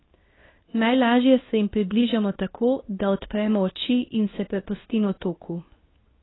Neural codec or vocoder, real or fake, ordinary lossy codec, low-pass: codec, 24 kHz, 0.9 kbps, WavTokenizer, medium speech release version 2; fake; AAC, 16 kbps; 7.2 kHz